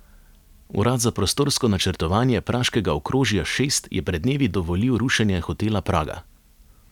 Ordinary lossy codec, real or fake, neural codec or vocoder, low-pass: none; real; none; 19.8 kHz